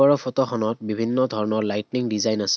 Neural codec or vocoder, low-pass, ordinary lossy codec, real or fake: none; none; none; real